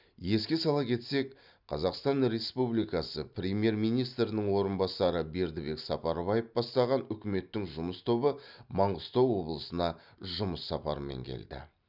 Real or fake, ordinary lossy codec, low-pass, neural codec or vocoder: real; none; 5.4 kHz; none